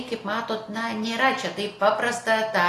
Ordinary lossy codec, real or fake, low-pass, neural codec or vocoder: AAC, 48 kbps; real; 14.4 kHz; none